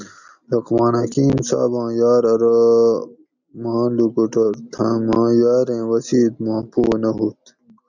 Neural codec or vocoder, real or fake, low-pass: none; real; 7.2 kHz